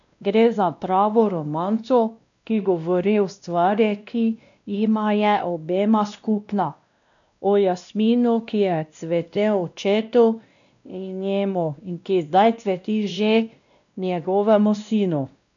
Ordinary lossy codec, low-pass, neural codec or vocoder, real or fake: none; 7.2 kHz; codec, 16 kHz, 1 kbps, X-Codec, WavLM features, trained on Multilingual LibriSpeech; fake